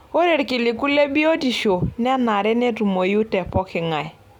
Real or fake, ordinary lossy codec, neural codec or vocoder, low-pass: real; none; none; 19.8 kHz